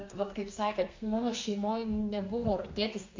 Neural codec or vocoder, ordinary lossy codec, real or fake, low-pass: codec, 32 kHz, 1.9 kbps, SNAC; MP3, 48 kbps; fake; 7.2 kHz